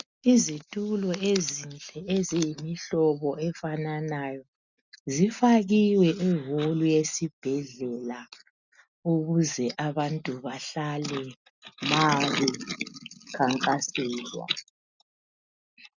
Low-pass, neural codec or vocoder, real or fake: 7.2 kHz; none; real